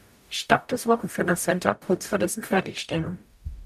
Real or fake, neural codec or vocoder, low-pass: fake; codec, 44.1 kHz, 0.9 kbps, DAC; 14.4 kHz